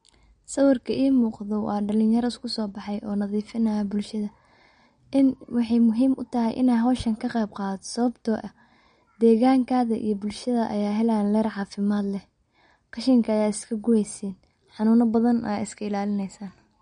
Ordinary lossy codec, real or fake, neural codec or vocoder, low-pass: MP3, 48 kbps; real; none; 9.9 kHz